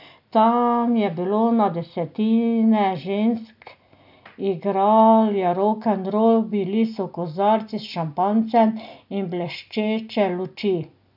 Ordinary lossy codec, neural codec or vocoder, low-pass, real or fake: none; none; 5.4 kHz; real